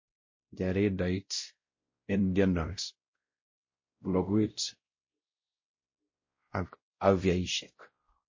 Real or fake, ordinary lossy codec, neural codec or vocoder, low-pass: fake; MP3, 32 kbps; codec, 16 kHz, 0.5 kbps, X-Codec, WavLM features, trained on Multilingual LibriSpeech; 7.2 kHz